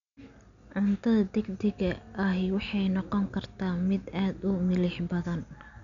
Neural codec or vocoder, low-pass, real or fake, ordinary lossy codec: none; 7.2 kHz; real; none